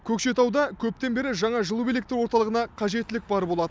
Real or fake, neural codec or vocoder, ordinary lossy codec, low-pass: real; none; none; none